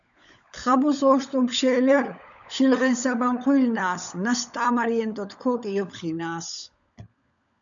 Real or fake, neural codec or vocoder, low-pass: fake; codec, 16 kHz, 16 kbps, FunCodec, trained on LibriTTS, 50 frames a second; 7.2 kHz